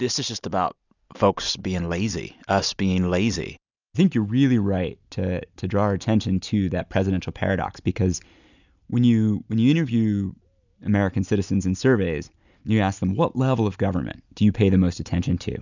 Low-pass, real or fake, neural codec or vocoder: 7.2 kHz; real; none